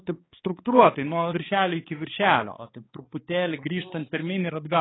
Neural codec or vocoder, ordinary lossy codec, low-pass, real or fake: codec, 16 kHz, 4 kbps, X-Codec, HuBERT features, trained on balanced general audio; AAC, 16 kbps; 7.2 kHz; fake